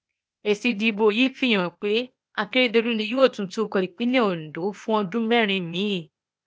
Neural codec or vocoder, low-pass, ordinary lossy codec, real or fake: codec, 16 kHz, 0.8 kbps, ZipCodec; none; none; fake